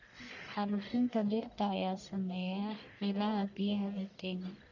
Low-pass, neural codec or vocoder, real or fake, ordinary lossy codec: 7.2 kHz; codec, 44.1 kHz, 1.7 kbps, Pupu-Codec; fake; none